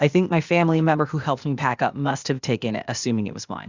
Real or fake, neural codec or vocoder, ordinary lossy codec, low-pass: fake; codec, 16 kHz, 0.8 kbps, ZipCodec; Opus, 64 kbps; 7.2 kHz